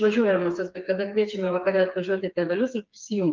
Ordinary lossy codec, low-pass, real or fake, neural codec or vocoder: Opus, 24 kbps; 7.2 kHz; fake; codec, 16 kHz, 4 kbps, FreqCodec, smaller model